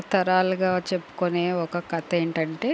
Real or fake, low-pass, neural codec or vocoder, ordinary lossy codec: real; none; none; none